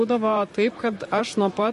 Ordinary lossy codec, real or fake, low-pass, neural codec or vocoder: MP3, 48 kbps; fake; 14.4 kHz; vocoder, 44.1 kHz, 128 mel bands, Pupu-Vocoder